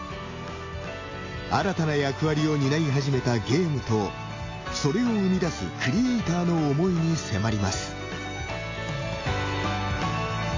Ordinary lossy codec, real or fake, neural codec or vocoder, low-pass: AAC, 32 kbps; real; none; 7.2 kHz